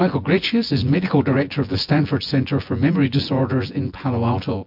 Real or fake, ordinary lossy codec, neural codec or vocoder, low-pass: fake; MP3, 48 kbps; vocoder, 24 kHz, 100 mel bands, Vocos; 5.4 kHz